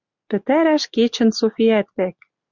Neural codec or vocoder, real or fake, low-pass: none; real; 7.2 kHz